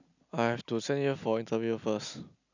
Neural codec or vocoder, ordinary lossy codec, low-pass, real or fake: none; none; 7.2 kHz; real